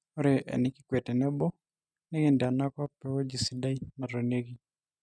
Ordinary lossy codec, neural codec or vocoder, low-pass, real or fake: none; none; none; real